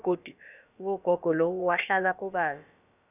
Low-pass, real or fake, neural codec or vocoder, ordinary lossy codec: 3.6 kHz; fake; codec, 16 kHz, about 1 kbps, DyCAST, with the encoder's durations; none